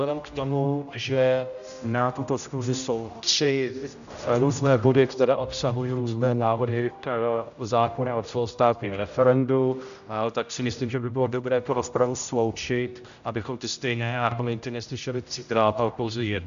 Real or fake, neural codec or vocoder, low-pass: fake; codec, 16 kHz, 0.5 kbps, X-Codec, HuBERT features, trained on general audio; 7.2 kHz